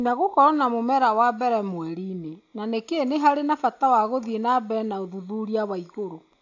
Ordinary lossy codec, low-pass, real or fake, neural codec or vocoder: AAC, 48 kbps; 7.2 kHz; real; none